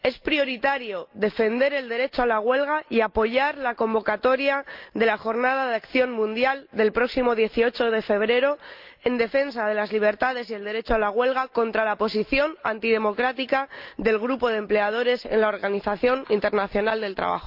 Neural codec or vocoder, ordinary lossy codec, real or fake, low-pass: none; Opus, 24 kbps; real; 5.4 kHz